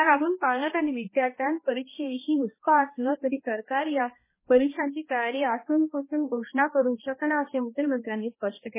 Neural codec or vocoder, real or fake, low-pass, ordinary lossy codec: codec, 16 kHz, 1 kbps, X-Codec, HuBERT features, trained on balanced general audio; fake; 3.6 kHz; MP3, 16 kbps